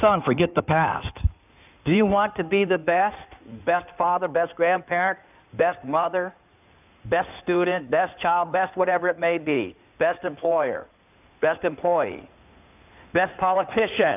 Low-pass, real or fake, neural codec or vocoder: 3.6 kHz; fake; codec, 16 kHz in and 24 kHz out, 2.2 kbps, FireRedTTS-2 codec